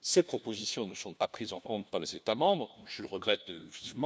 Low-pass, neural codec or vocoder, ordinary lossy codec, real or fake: none; codec, 16 kHz, 1 kbps, FunCodec, trained on LibriTTS, 50 frames a second; none; fake